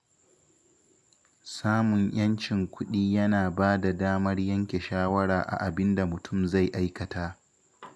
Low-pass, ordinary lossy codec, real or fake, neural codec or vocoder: none; none; real; none